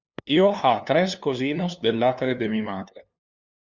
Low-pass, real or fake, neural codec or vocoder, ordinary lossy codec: 7.2 kHz; fake; codec, 16 kHz, 2 kbps, FunCodec, trained on LibriTTS, 25 frames a second; Opus, 64 kbps